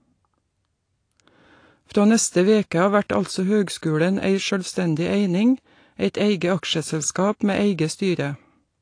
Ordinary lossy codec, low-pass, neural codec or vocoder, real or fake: AAC, 48 kbps; 9.9 kHz; none; real